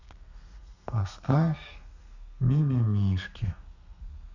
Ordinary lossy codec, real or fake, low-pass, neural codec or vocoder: none; fake; 7.2 kHz; codec, 32 kHz, 1.9 kbps, SNAC